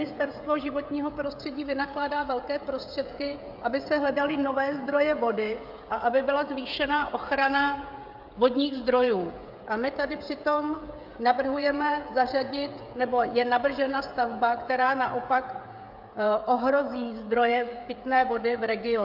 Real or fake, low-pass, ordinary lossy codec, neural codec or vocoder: fake; 5.4 kHz; AAC, 48 kbps; codec, 16 kHz, 16 kbps, FreqCodec, smaller model